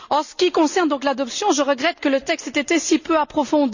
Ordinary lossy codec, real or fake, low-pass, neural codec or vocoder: none; real; 7.2 kHz; none